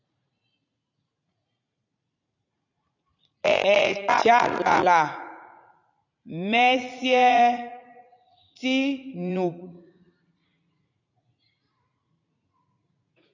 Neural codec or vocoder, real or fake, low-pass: vocoder, 44.1 kHz, 80 mel bands, Vocos; fake; 7.2 kHz